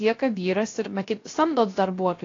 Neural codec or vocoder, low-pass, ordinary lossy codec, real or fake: codec, 16 kHz, 0.3 kbps, FocalCodec; 7.2 kHz; AAC, 32 kbps; fake